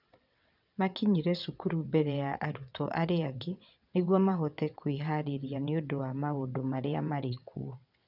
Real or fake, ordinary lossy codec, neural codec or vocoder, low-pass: fake; none; vocoder, 22.05 kHz, 80 mel bands, WaveNeXt; 5.4 kHz